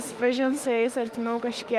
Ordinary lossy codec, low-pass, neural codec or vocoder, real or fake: Opus, 32 kbps; 14.4 kHz; autoencoder, 48 kHz, 32 numbers a frame, DAC-VAE, trained on Japanese speech; fake